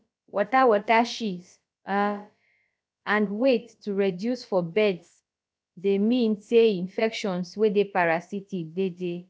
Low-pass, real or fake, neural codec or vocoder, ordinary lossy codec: none; fake; codec, 16 kHz, about 1 kbps, DyCAST, with the encoder's durations; none